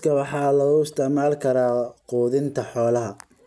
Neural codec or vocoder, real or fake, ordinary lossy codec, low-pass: none; real; none; none